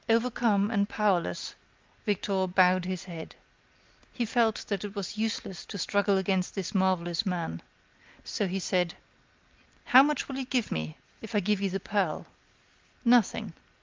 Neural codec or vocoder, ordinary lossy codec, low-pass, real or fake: none; Opus, 32 kbps; 7.2 kHz; real